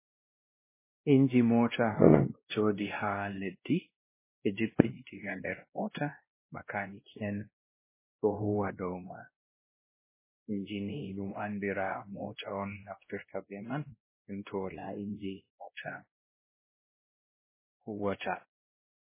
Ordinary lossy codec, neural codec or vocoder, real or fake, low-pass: MP3, 16 kbps; codec, 16 kHz, 1 kbps, X-Codec, WavLM features, trained on Multilingual LibriSpeech; fake; 3.6 kHz